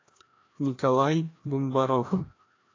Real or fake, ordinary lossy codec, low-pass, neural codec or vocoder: fake; AAC, 32 kbps; 7.2 kHz; codec, 16 kHz, 1 kbps, FreqCodec, larger model